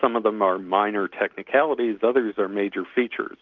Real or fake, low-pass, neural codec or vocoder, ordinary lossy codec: real; 7.2 kHz; none; Opus, 32 kbps